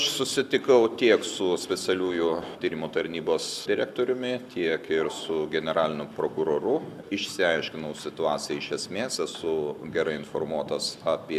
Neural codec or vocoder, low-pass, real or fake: none; 14.4 kHz; real